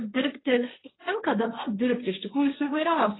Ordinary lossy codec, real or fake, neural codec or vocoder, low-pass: AAC, 16 kbps; fake; codec, 16 kHz, 1.1 kbps, Voila-Tokenizer; 7.2 kHz